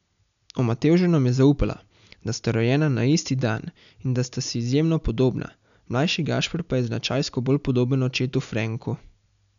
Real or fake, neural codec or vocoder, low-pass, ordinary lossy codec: real; none; 7.2 kHz; none